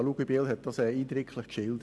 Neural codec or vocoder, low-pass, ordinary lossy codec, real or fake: none; none; none; real